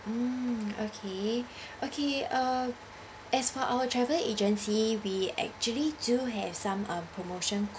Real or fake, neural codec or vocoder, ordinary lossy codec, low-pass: real; none; none; none